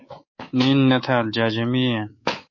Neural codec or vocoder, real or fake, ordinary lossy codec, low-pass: codec, 24 kHz, 3.1 kbps, DualCodec; fake; MP3, 32 kbps; 7.2 kHz